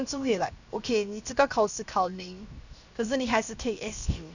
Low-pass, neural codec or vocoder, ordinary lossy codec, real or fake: 7.2 kHz; codec, 16 kHz, 0.7 kbps, FocalCodec; none; fake